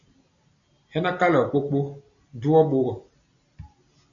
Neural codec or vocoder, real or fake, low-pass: none; real; 7.2 kHz